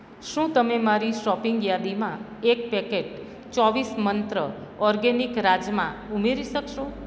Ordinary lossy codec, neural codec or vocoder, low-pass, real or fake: none; none; none; real